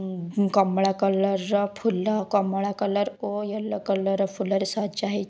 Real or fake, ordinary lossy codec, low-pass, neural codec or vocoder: real; none; none; none